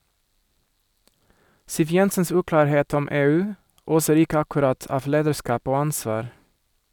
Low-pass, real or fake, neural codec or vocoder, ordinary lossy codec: none; real; none; none